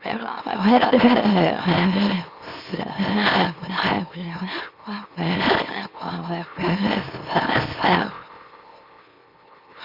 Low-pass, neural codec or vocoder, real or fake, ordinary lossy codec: 5.4 kHz; autoencoder, 44.1 kHz, a latent of 192 numbers a frame, MeloTTS; fake; Opus, 64 kbps